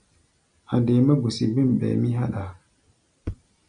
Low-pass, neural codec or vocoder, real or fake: 9.9 kHz; none; real